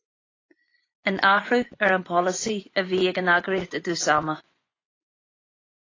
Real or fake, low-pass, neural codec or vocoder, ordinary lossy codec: fake; 7.2 kHz; vocoder, 24 kHz, 100 mel bands, Vocos; AAC, 32 kbps